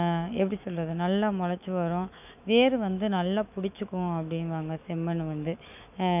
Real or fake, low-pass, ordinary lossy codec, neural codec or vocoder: fake; 3.6 kHz; none; autoencoder, 48 kHz, 128 numbers a frame, DAC-VAE, trained on Japanese speech